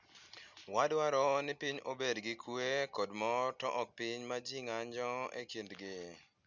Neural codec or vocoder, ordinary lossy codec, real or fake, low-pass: none; none; real; 7.2 kHz